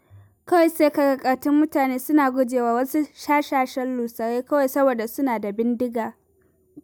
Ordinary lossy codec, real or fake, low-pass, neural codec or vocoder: none; real; none; none